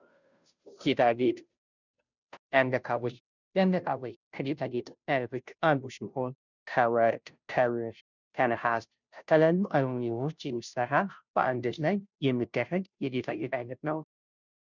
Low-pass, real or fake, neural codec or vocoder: 7.2 kHz; fake; codec, 16 kHz, 0.5 kbps, FunCodec, trained on Chinese and English, 25 frames a second